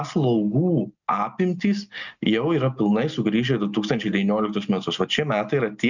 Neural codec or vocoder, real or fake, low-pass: none; real; 7.2 kHz